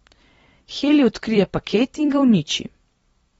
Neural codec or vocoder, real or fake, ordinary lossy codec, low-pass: vocoder, 44.1 kHz, 128 mel bands every 256 samples, BigVGAN v2; fake; AAC, 24 kbps; 19.8 kHz